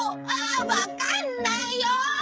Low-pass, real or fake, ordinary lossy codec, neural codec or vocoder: none; fake; none; codec, 16 kHz, 16 kbps, FreqCodec, smaller model